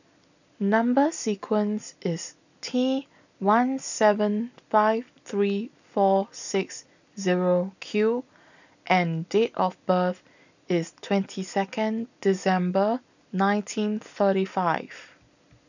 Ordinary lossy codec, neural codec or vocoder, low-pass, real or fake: none; none; 7.2 kHz; real